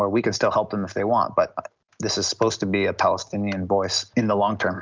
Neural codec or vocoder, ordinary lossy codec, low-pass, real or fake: none; Opus, 32 kbps; 7.2 kHz; real